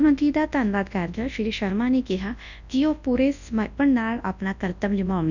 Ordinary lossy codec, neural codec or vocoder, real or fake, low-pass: none; codec, 24 kHz, 0.9 kbps, WavTokenizer, large speech release; fake; 7.2 kHz